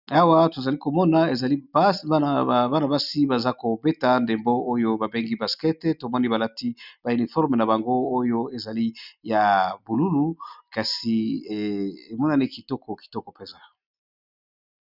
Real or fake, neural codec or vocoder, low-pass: real; none; 5.4 kHz